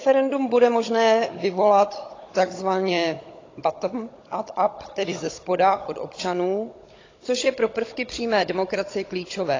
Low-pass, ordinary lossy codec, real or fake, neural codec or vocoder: 7.2 kHz; AAC, 32 kbps; fake; codec, 16 kHz, 16 kbps, FunCodec, trained on Chinese and English, 50 frames a second